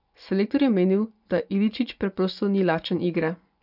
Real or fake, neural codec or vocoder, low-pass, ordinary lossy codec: fake; vocoder, 24 kHz, 100 mel bands, Vocos; 5.4 kHz; none